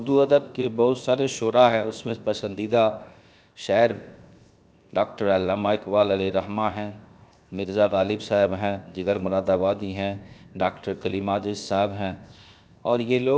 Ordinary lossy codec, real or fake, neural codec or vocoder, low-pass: none; fake; codec, 16 kHz, 0.7 kbps, FocalCodec; none